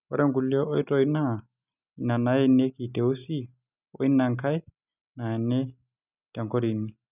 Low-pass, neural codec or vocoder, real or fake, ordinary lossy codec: 3.6 kHz; none; real; none